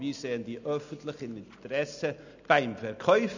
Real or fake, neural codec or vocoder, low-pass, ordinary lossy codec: real; none; 7.2 kHz; none